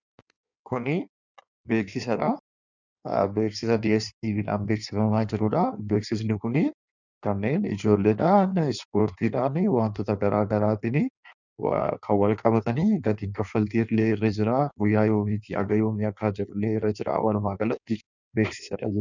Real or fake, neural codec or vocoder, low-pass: fake; codec, 16 kHz in and 24 kHz out, 1.1 kbps, FireRedTTS-2 codec; 7.2 kHz